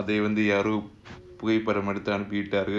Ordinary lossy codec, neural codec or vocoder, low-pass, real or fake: none; none; none; real